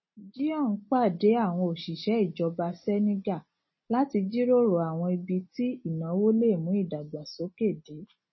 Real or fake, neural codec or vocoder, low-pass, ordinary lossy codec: real; none; 7.2 kHz; MP3, 24 kbps